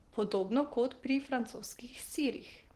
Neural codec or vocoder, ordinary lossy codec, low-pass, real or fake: vocoder, 44.1 kHz, 128 mel bands every 512 samples, BigVGAN v2; Opus, 16 kbps; 14.4 kHz; fake